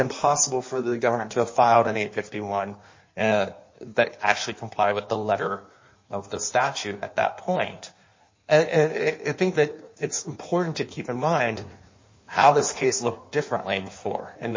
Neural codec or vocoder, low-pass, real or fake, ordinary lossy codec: codec, 16 kHz in and 24 kHz out, 1.1 kbps, FireRedTTS-2 codec; 7.2 kHz; fake; MP3, 32 kbps